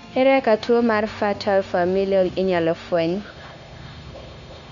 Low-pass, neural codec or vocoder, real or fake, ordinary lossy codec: 7.2 kHz; codec, 16 kHz, 0.9 kbps, LongCat-Audio-Codec; fake; none